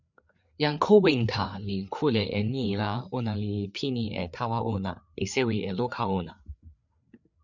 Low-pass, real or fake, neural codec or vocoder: 7.2 kHz; fake; codec, 16 kHz, 4 kbps, FreqCodec, larger model